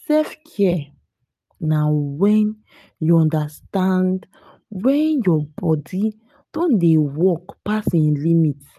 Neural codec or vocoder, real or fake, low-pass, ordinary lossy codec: none; real; 14.4 kHz; none